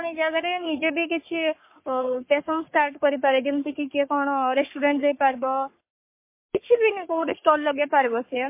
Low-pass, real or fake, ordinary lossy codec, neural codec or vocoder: 3.6 kHz; fake; MP3, 24 kbps; codec, 44.1 kHz, 3.4 kbps, Pupu-Codec